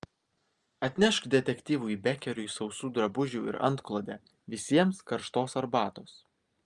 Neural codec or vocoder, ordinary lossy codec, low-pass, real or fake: none; Opus, 32 kbps; 10.8 kHz; real